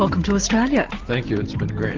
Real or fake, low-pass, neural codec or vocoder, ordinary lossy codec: real; 7.2 kHz; none; Opus, 16 kbps